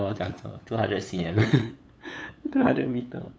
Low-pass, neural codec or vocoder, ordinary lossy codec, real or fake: none; codec, 16 kHz, 8 kbps, FunCodec, trained on LibriTTS, 25 frames a second; none; fake